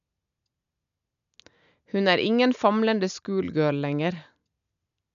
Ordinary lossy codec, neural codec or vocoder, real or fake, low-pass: none; none; real; 7.2 kHz